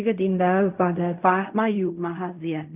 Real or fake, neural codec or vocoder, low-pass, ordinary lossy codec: fake; codec, 16 kHz in and 24 kHz out, 0.4 kbps, LongCat-Audio-Codec, fine tuned four codebook decoder; 3.6 kHz; none